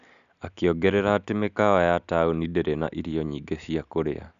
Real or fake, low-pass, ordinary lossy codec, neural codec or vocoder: real; 7.2 kHz; none; none